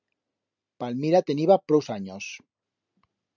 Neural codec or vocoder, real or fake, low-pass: none; real; 7.2 kHz